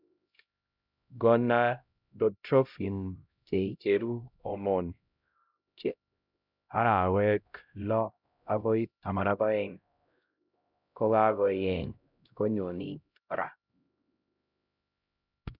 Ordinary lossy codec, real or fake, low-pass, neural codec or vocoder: none; fake; 5.4 kHz; codec, 16 kHz, 0.5 kbps, X-Codec, HuBERT features, trained on LibriSpeech